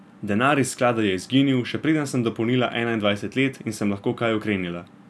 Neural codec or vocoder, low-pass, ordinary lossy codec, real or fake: none; none; none; real